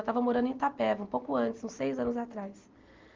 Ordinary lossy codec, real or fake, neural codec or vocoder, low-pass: Opus, 32 kbps; real; none; 7.2 kHz